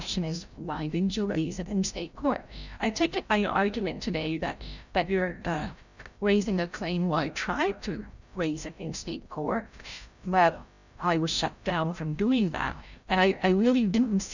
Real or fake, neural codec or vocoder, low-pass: fake; codec, 16 kHz, 0.5 kbps, FreqCodec, larger model; 7.2 kHz